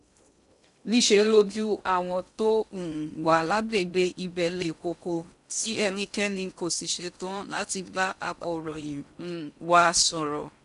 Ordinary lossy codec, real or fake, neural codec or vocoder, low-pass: none; fake; codec, 16 kHz in and 24 kHz out, 0.6 kbps, FocalCodec, streaming, 2048 codes; 10.8 kHz